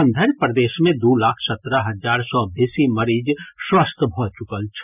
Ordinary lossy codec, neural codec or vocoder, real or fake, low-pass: none; none; real; 3.6 kHz